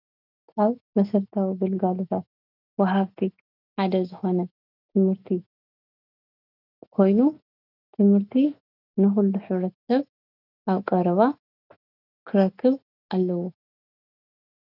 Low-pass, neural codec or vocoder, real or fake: 5.4 kHz; none; real